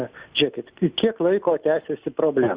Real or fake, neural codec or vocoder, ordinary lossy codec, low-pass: real; none; Opus, 64 kbps; 3.6 kHz